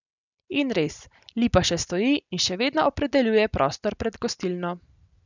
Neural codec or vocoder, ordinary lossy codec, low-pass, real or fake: none; none; 7.2 kHz; real